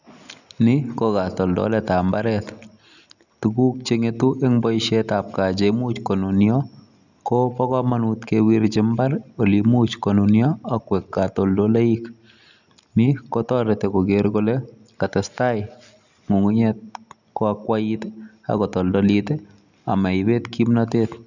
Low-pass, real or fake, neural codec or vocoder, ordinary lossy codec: 7.2 kHz; real; none; none